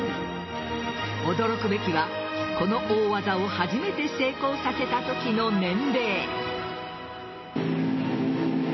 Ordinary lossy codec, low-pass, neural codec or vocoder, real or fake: MP3, 24 kbps; 7.2 kHz; none; real